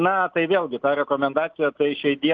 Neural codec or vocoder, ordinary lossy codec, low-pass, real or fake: none; Opus, 24 kbps; 7.2 kHz; real